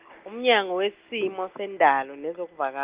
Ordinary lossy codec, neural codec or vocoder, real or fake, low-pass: Opus, 32 kbps; none; real; 3.6 kHz